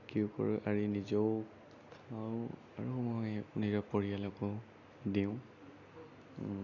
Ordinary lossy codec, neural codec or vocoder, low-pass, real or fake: none; none; 7.2 kHz; real